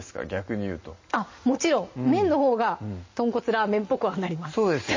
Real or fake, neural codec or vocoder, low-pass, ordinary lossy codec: real; none; 7.2 kHz; MP3, 32 kbps